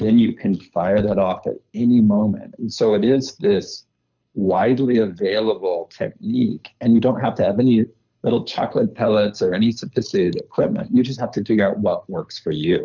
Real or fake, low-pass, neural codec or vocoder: fake; 7.2 kHz; codec, 24 kHz, 6 kbps, HILCodec